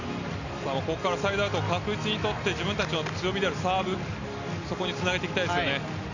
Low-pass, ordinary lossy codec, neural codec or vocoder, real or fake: 7.2 kHz; none; none; real